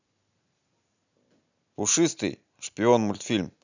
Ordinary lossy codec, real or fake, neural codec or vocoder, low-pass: none; real; none; 7.2 kHz